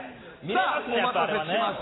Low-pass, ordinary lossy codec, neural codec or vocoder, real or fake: 7.2 kHz; AAC, 16 kbps; none; real